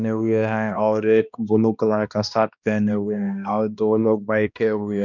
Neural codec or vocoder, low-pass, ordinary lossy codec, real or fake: codec, 16 kHz, 1 kbps, X-Codec, HuBERT features, trained on balanced general audio; 7.2 kHz; none; fake